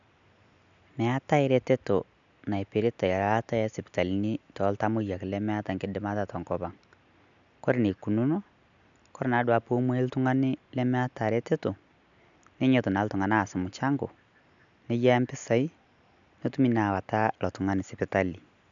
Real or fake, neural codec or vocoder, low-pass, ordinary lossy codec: real; none; 7.2 kHz; none